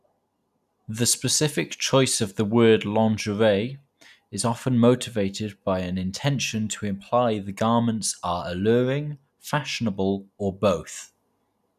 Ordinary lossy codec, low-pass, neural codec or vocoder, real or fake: none; 14.4 kHz; none; real